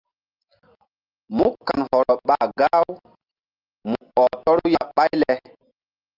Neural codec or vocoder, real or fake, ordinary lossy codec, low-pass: none; real; Opus, 32 kbps; 5.4 kHz